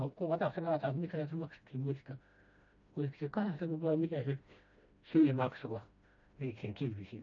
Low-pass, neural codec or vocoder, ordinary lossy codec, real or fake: 7.2 kHz; codec, 16 kHz, 1 kbps, FreqCodec, smaller model; MP3, 48 kbps; fake